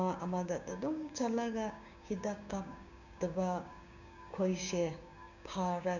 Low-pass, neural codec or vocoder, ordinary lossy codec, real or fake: 7.2 kHz; codec, 16 kHz, 6 kbps, DAC; none; fake